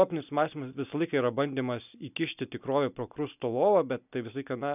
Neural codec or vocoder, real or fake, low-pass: none; real; 3.6 kHz